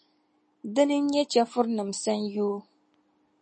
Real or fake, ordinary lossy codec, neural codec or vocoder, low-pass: real; MP3, 32 kbps; none; 10.8 kHz